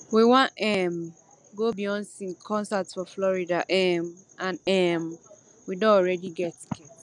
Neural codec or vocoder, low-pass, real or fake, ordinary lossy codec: none; 10.8 kHz; real; none